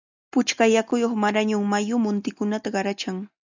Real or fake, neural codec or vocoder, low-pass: real; none; 7.2 kHz